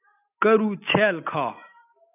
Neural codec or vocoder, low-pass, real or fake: none; 3.6 kHz; real